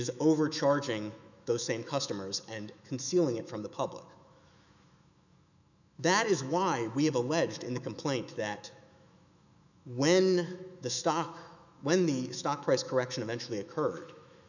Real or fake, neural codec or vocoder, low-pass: fake; autoencoder, 48 kHz, 128 numbers a frame, DAC-VAE, trained on Japanese speech; 7.2 kHz